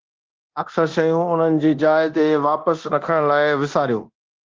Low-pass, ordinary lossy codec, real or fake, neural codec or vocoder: 7.2 kHz; Opus, 16 kbps; fake; codec, 24 kHz, 0.9 kbps, DualCodec